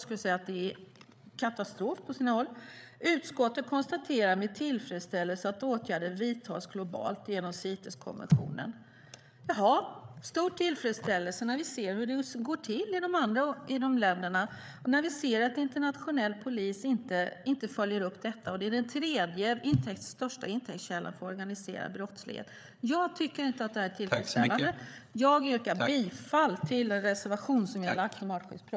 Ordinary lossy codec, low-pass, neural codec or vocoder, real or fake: none; none; codec, 16 kHz, 8 kbps, FreqCodec, larger model; fake